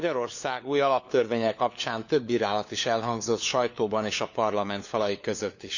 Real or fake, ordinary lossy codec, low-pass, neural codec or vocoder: fake; none; 7.2 kHz; codec, 16 kHz, 4 kbps, FunCodec, trained on LibriTTS, 50 frames a second